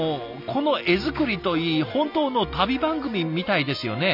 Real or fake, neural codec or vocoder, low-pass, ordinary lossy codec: real; none; 5.4 kHz; none